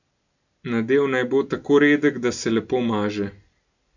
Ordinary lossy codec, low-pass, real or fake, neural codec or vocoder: none; 7.2 kHz; real; none